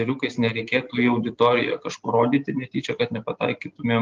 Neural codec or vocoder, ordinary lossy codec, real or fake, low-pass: none; Opus, 32 kbps; real; 7.2 kHz